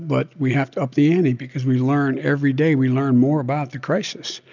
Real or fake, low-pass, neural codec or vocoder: real; 7.2 kHz; none